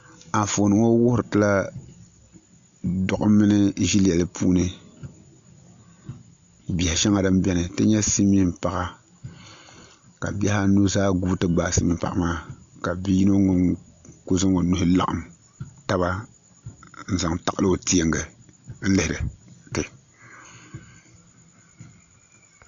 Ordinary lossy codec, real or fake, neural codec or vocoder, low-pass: AAC, 64 kbps; real; none; 7.2 kHz